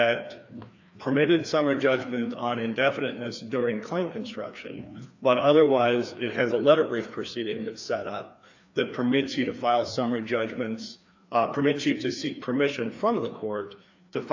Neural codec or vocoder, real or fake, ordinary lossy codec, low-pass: codec, 16 kHz, 2 kbps, FreqCodec, larger model; fake; AAC, 48 kbps; 7.2 kHz